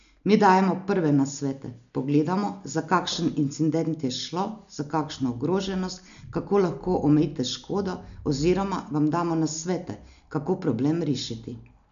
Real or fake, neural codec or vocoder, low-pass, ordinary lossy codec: real; none; 7.2 kHz; none